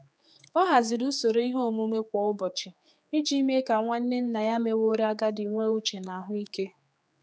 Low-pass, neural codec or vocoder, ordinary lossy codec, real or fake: none; codec, 16 kHz, 4 kbps, X-Codec, HuBERT features, trained on general audio; none; fake